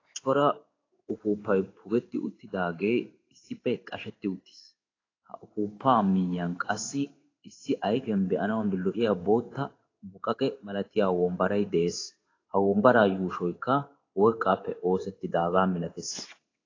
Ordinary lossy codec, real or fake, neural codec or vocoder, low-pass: AAC, 32 kbps; fake; codec, 16 kHz in and 24 kHz out, 1 kbps, XY-Tokenizer; 7.2 kHz